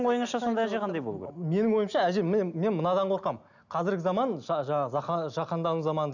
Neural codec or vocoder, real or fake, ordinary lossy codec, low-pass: none; real; none; 7.2 kHz